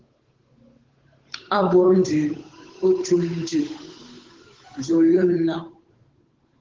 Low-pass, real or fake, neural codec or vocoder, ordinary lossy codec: 7.2 kHz; fake; codec, 16 kHz, 8 kbps, FunCodec, trained on Chinese and English, 25 frames a second; Opus, 16 kbps